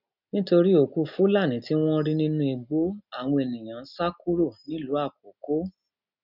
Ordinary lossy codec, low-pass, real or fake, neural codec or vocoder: none; 5.4 kHz; real; none